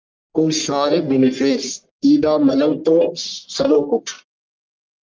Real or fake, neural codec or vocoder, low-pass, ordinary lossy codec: fake; codec, 44.1 kHz, 1.7 kbps, Pupu-Codec; 7.2 kHz; Opus, 24 kbps